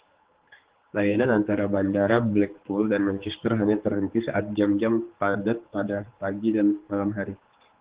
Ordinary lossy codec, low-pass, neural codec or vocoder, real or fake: Opus, 16 kbps; 3.6 kHz; codec, 16 kHz, 4 kbps, X-Codec, HuBERT features, trained on general audio; fake